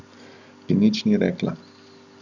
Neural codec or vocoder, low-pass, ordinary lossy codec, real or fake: none; 7.2 kHz; none; real